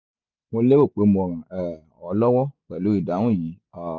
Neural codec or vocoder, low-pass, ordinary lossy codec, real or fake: none; 7.2 kHz; none; real